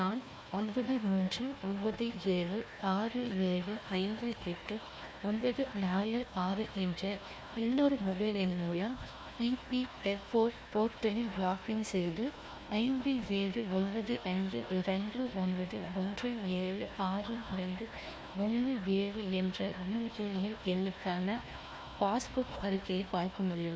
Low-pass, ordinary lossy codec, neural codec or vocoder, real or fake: none; none; codec, 16 kHz, 1 kbps, FunCodec, trained on Chinese and English, 50 frames a second; fake